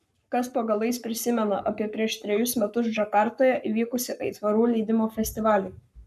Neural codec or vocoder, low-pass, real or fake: codec, 44.1 kHz, 7.8 kbps, Pupu-Codec; 14.4 kHz; fake